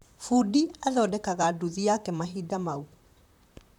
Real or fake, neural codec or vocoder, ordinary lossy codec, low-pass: fake; vocoder, 44.1 kHz, 128 mel bands, Pupu-Vocoder; none; 19.8 kHz